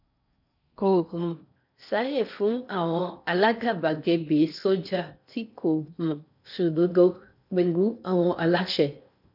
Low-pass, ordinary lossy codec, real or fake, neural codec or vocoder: 5.4 kHz; none; fake; codec, 16 kHz in and 24 kHz out, 0.6 kbps, FocalCodec, streaming, 4096 codes